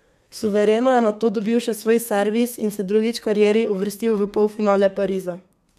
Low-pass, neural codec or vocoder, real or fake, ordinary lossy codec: 14.4 kHz; codec, 32 kHz, 1.9 kbps, SNAC; fake; none